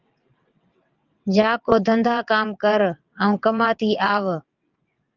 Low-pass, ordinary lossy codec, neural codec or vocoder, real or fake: 7.2 kHz; Opus, 24 kbps; vocoder, 22.05 kHz, 80 mel bands, WaveNeXt; fake